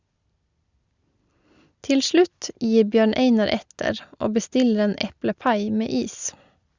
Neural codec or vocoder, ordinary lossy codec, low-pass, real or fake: none; Opus, 64 kbps; 7.2 kHz; real